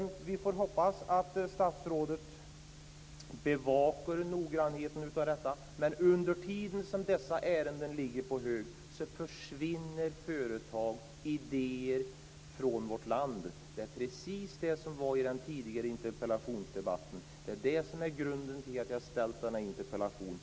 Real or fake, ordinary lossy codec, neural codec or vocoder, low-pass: real; none; none; none